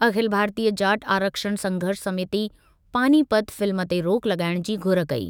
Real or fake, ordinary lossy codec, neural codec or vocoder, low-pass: fake; none; autoencoder, 48 kHz, 128 numbers a frame, DAC-VAE, trained on Japanese speech; none